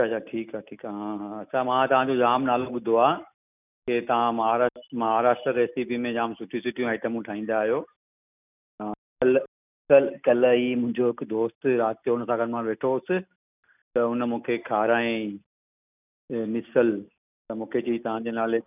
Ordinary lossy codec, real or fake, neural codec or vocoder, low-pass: none; real; none; 3.6 kHz